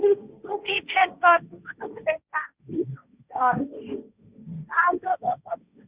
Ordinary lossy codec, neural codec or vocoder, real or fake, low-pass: Opus, 64 kbps; codec, 16 kHz, 1.1 kbps, Voila-Tokenizer; fake; 3.6 kHz